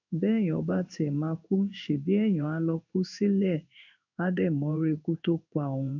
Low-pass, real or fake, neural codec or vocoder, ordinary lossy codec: 7.2 kHz; fake; codec, 16 kHz in and 24 kHz out, 1 kbps, XY-Tokenizer; none